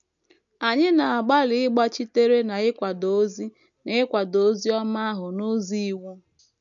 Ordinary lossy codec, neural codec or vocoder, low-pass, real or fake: none; none; 7.2 kHz; real